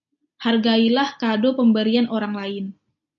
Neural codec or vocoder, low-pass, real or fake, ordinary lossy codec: none; 7.2 kHz; real; MP3, 48 kbps